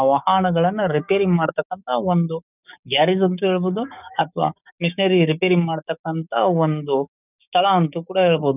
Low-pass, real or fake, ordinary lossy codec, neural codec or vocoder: 3.6 kHz; fake; none; codec, 16 kHz, 6 kbps, DAC